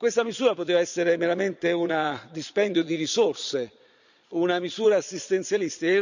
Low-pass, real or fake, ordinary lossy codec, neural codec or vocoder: 7.2 kHz; fake; none; vocoder, 22.05 kHz, 80 mel bands, Vocos